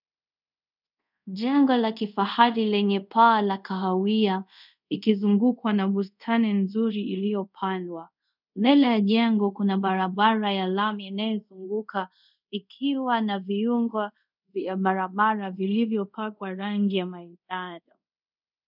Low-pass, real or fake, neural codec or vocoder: 5.4 kHz; fake; codec, 24 kHz, 0.5 kbps, DualCodec